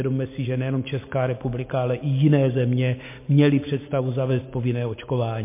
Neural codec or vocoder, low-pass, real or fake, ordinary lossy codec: none; 3.6 kHz; real; MP3, 24 kbps